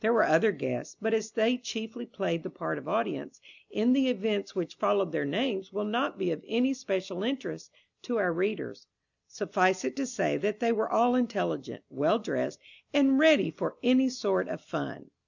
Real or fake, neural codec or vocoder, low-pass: real; none; 7.2 kHz